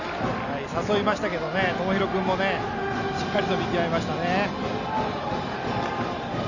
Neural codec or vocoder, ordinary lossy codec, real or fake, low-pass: none; none; real; 7.2 kHz